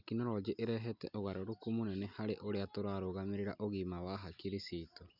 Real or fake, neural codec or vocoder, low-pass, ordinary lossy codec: real; none; 5.4 kHz; none